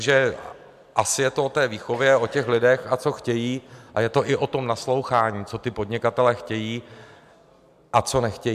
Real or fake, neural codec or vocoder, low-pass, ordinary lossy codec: real; none; 14.4 kHz; MP3, 96 kbps